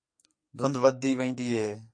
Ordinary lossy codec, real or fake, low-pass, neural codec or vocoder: MP3, 48 kbps; fake; 9.9 kHz; codec, 44.1 kHz, 2.6 kbps, SNAC